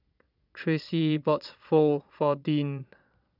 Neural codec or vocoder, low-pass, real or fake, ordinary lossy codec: vocoder, 22.05 kHz, 80 mel bands, Vocos; 5.4 kHz; fake; none